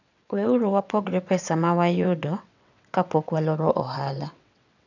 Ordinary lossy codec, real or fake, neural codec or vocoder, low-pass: none; fake; vocoder, 22.05 kHz, 80 mel bands, WaveNeXt; 7.2 kHz